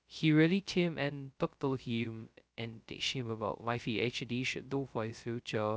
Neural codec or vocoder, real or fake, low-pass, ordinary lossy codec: codec, 16 kHz, 0.2 kbps, FocalCodec; fake; none; none